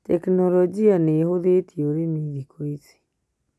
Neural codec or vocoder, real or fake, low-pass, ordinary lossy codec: none; real; none; none